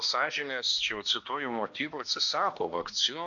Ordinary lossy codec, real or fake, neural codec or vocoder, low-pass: AAC, 64 kbps; fake; codec, 16 kHz, 1 kbps, X-Codec, HuBERT features, trained on balanced general audio; 7.2 kHz